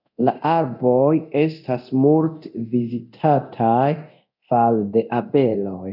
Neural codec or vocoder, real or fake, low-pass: codec, 24 kHz, 0.9 kbps, DualCodec; fake; 5.4 kHz